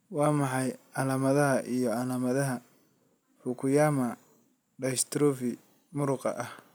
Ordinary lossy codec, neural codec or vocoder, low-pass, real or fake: none; none; none; real